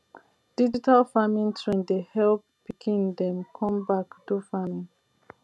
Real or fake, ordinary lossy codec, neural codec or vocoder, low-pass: real; none; none; none